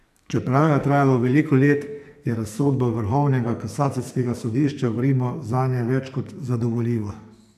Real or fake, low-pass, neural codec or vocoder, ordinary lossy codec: fake; 14.4 kHz; codec, 44.1 kHz, 2.6 kbps, SNAC; none